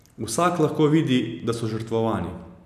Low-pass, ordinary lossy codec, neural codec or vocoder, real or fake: 14.4 kHz; none; none; real